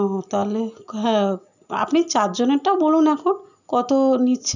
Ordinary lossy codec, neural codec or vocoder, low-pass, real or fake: none; none; 7.2 kHz; real